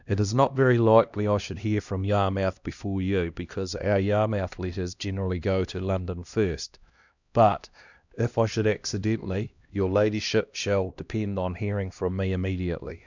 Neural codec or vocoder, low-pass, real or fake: codec, 16 kHz, 1 kbps, X-Codec, HuBERT features, trained on LibriSpeech; 7.2 kHz; fake